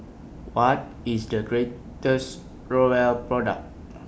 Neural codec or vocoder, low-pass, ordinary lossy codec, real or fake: none; none; none; real